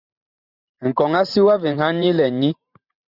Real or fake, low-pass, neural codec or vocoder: fake; 5.4 kHz; vocoder, 44.1 kHz, 128 mel bands every 512 samples, BigVGAN v2